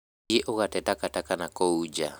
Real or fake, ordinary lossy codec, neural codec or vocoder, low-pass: real; none; none; none